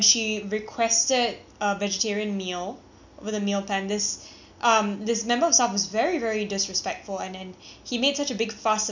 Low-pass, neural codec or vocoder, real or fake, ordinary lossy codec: 7.2 kHz; none; real; none